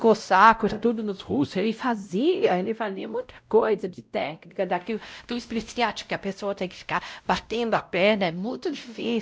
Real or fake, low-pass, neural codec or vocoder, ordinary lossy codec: fake; none; codec, 16 kHz, 0.5 kbps, X-Codec, WavLM features, trained on Multilingual LibriSpeech; none